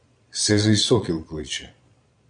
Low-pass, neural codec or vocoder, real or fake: 9.9 kHz; vocoder, 22.05 kHz, 80 mel bands, Vocos; fake